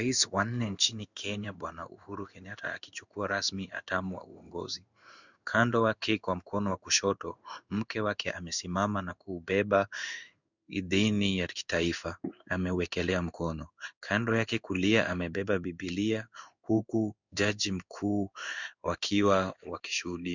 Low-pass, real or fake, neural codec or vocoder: 7.2 kHz; fake; codec, 16 kHz in and 24 kHz out, 1 kbps, XY-Tokenizer